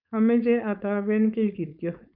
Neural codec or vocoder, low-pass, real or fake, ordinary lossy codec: codec, 16 kHz, 4.8 kbps, FACodec; 5.4 kHz; fake; none